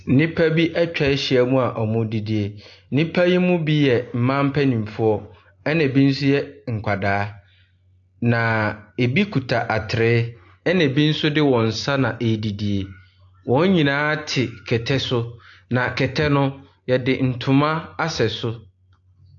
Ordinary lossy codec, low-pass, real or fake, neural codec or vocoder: AAC, 48 kbps; 7.2 kHz; real; none